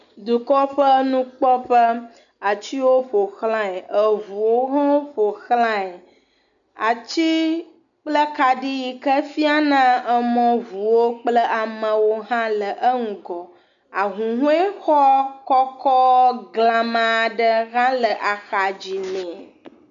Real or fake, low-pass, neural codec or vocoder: real; 7.2 kHz; none